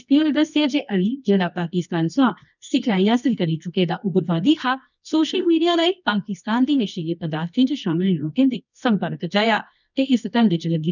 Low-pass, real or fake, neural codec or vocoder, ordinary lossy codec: 7.2 kHz; fake; codec, 24 kHz, 0.9 kbps, WavTokenizer, medium music audio release; none